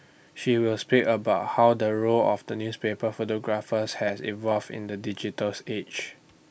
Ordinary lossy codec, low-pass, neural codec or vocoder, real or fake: none; none; none; real